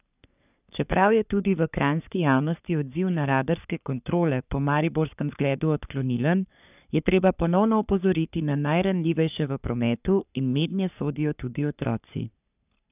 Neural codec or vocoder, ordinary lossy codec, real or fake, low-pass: codec, 44.1 kHz, 3.4 kbps, Pupu-Codec; none; fake; 3.6 kHz